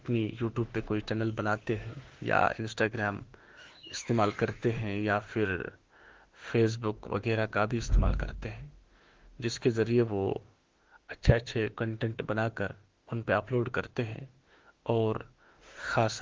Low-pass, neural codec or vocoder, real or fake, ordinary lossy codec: 7.2 kHz; autoencoder, 48 kHz, 32 numbers a frame, DAC-VAE, trained on Japanese speech; fake; Opus, 16 kbps